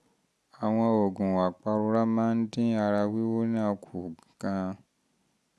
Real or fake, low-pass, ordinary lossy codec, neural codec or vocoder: real; none; none; none